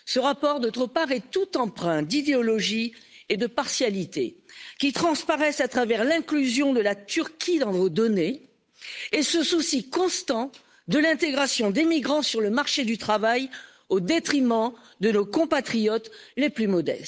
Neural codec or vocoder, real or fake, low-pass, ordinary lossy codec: codec, 16 kHz, 8 kbps, FunCodec, trained on Chinese and English, 25 frames a second; fake; none; none